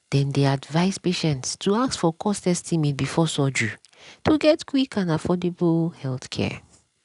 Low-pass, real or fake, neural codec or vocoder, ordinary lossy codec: 10.8 kHz; real; none; none